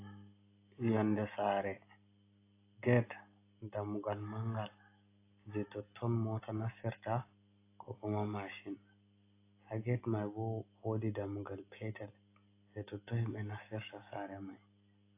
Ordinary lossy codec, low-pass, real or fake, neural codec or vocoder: AAC, 24 kbps; 3.6 kHz; real; none